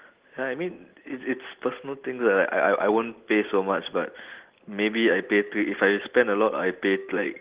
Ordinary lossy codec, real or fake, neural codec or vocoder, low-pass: Opus, 16 kbps; real; none; 3.6 kHz